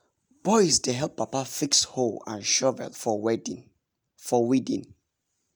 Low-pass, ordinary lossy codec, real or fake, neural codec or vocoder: none; none; real; none